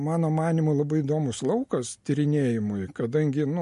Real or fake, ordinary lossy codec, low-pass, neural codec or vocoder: real; MP3, 48 kbps; 14.4 kHz; none